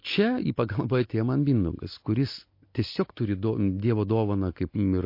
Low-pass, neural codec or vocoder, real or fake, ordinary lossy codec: 5.4 kHz; none; real; MP3, 32 kbps